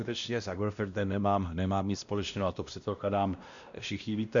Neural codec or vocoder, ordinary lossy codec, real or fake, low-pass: codec, 16 kHz, 1 kbps, X-Codec, WavLM features, trained on Multilingual LibriSpeech; Opus, 64 kbps; fake; 7.2 kHz